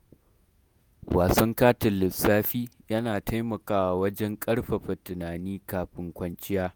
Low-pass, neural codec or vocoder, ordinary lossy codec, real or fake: none; vocoder, 48 kHz, 128 mel bands, Vocos; none; fake